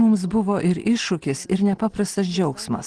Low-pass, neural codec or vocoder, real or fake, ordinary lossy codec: 9.9 kHz; none; real; Opus, 16 kbps